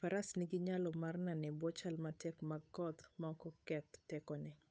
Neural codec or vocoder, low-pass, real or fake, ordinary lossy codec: codec, 16 kHz, 8 kbps, FunCodec, trained on Chinese and English, 25 frames a second; none; fake; none